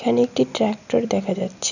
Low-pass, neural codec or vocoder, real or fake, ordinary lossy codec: 7.2 kHz; none; real; none